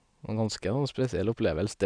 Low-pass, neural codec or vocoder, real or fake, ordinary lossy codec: 9.9 kHz; none; real; none